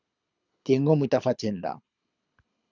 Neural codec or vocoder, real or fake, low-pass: codec, 24 kHz, 6 kbps, HILCodec; fake; 7.2 kHz